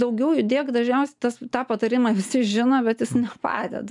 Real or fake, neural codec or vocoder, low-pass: real; none; 10.8 kHz